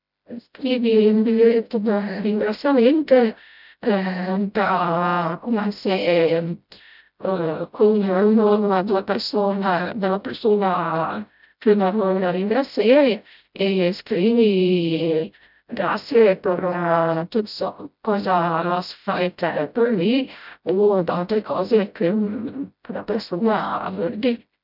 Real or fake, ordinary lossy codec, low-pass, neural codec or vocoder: fake; none; 5.4 kHz; codec, 16 kHz, 0.5 kbps, FreqCodec, smaller model